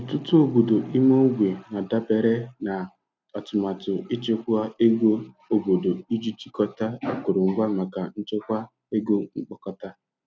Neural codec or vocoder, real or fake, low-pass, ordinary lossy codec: none; real; none; none